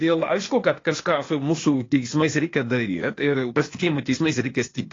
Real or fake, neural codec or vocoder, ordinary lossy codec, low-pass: fake; codec, 16 kHz, 0.8 kbps, ZipCodec; AAC, 32 kbps; 7.2 kHz